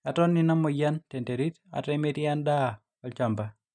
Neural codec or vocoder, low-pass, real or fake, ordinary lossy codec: none; 9.9 kHz; real; none